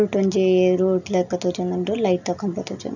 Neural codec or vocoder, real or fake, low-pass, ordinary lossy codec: none; real; 7.2 kHz; none